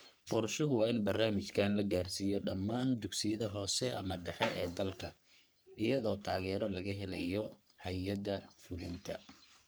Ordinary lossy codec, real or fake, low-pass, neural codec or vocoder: none; fake; none; codec, 44.1 kHz, 3.4 kbps, Pupu-Codec